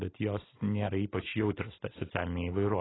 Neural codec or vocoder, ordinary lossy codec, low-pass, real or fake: none; AAC, 16 kbps; 7.2 kHz; real